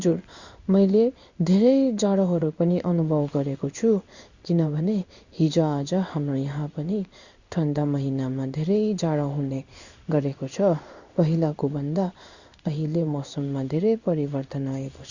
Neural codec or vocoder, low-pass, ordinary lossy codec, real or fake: codec, 16 kHz in and 24 kHz out, 1 kbps, XY-Tokenizer; 7.2 kHz; Opus, 64 kbps; fake